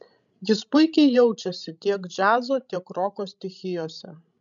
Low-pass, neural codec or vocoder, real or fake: 7.2 kHz; codec, 16 kHz, 16 kbps, FreqCodec, larger model; fake